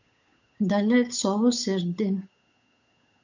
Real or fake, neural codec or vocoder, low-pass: fake; codec, 16 kHz, 8 kbps, FunCodec, trained on Chinese and English, 25 frames a second; 7.2 kHz